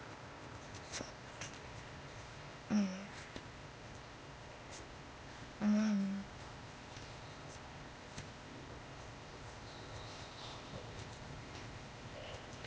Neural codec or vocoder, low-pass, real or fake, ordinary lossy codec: codec, 16 kHz, 0.8 kbps, ZipCodec; none; fake; none